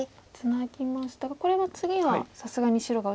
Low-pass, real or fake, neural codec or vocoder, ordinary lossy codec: none; real; none; none